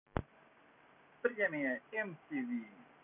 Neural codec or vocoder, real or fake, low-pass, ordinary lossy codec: none; real; 3.6 kHz; none